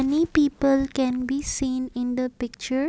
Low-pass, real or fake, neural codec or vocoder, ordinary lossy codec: none; real; none; none